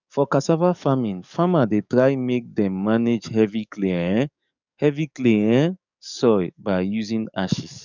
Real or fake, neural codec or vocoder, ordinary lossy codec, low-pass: fake; codec, 44.1 kHz, 7.8 kbps, DAC; none; 7.2 kHz